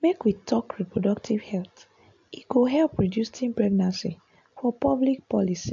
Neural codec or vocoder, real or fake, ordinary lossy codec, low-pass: none; real; AAC, 64 kbps; 7.2 kHz